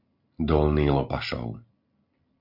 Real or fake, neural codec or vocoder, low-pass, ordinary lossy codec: real; none; 5.4 kHz; MP3, 48 kbps